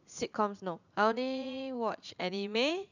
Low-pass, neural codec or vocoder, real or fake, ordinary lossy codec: 7.2 kHz; vocoder, 44.1 kHz, 80 mel bands, Vocos; fake; none